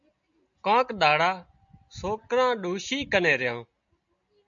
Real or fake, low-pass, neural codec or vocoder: real; 7.2 kHz; none